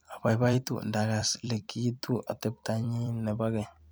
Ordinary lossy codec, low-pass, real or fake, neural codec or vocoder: none; none; fake; vocoder, 44.1 kHz, 128 mel bands every 256 samples, BigVGAN v2